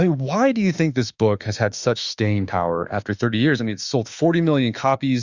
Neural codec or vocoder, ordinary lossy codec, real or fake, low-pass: autoencoder, 48 kHz, 32 numbers a frame, DAC-VAE, trained on Japanese speech; Opus, 64 kbps; fake; 7.2 kHz